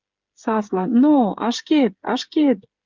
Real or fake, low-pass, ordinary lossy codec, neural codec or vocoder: fake; 7.2 kHz; Opus, 32 kbps; codec, 16 kHz, 8 kbps, FreqCodec, smaller model